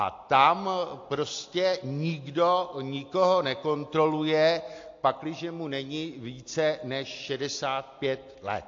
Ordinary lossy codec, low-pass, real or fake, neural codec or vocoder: AAC, 48 kbps; 7.2 kHz; real; none